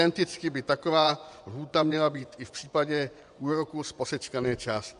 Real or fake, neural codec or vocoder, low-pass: fake; vocoder, 24 kHz, 100 mel bands, Vocos; 10.8 kHz